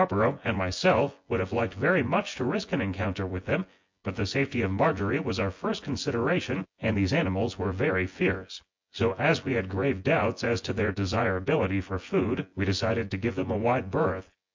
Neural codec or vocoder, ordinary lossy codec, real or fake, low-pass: vocoder, 24 kHz, 100 mel bands, Vocos; MP3, 48 kbps; fake; 7.2 kHz